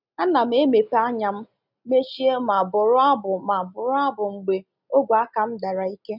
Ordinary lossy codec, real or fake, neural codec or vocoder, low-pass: none; real; none; 5.4 kHz